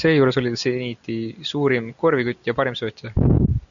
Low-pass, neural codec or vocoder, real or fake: 7.2 kHz; none; real